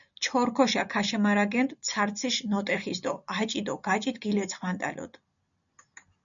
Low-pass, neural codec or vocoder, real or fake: 7.2 kHz; none; real